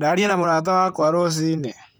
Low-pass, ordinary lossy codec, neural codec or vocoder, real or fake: none; none; vocoder, 44.1 kHz, 128 mel bands, Pupu-Vocoder; fake